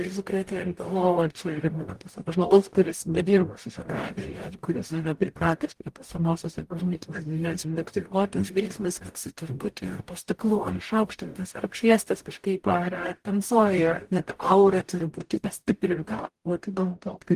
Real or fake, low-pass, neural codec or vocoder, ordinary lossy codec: fake; 14.4 kHz; codec, 44.1 kHz, 0.9 kbps, DAC; Opus, 24 kbps